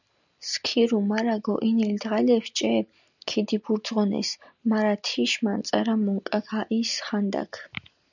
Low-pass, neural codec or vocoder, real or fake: 7.2 kHz; none; real